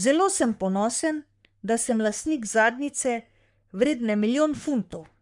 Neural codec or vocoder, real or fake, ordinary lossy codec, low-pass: codec, 44.1 kHz, 3.4 kbps, Pupu-Codec; fake; none; 10.8 kHz